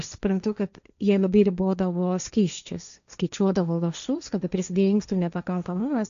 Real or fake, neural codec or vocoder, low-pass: fake; codec, 16 kHz, 1.1 kbps, Voila-Tokenizer; 7.2 kHz